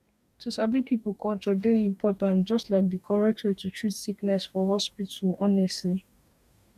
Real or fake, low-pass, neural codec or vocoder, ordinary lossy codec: fake; 14.4 kHz; codec, 44.1 kHz, 2.6 kbps, DAC; none